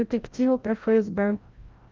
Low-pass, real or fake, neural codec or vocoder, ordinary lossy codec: 7.2 kHz; fake; codec, 16 kHz, 0.5 kbps, FreqCodec, larger model; Opus, 24 kbps